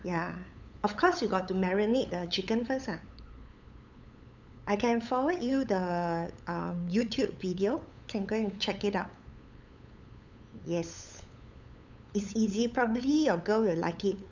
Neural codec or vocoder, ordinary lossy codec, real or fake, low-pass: codec, 16 kHz, 8 kbps, FunCodec, trained on LibriTTS, 25 frames a second; none; fake; 7.2 kHz